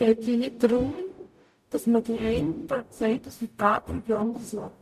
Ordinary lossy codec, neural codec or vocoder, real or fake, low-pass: none; codec, 44.1 kHz, 0.9 kbps, DAC; fake; 14.4 kHz